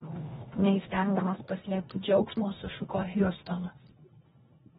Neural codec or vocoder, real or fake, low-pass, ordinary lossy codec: codec, 24 kHz, 1.5 kbps, HILCodec; fake; 10.8 kHz; AAC, 16 kbps